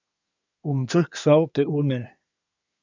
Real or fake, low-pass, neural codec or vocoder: fake; 7.2 kHz; codec, 24 kHz, 1 kbps, SNAC